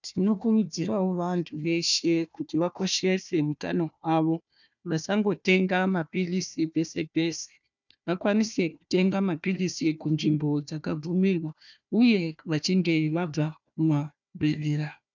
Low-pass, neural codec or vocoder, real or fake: 7.2 kHz; codec, 16 kHz, 1 kbps, FunCodec, trained on Chinese and English, 50 frames a second; fake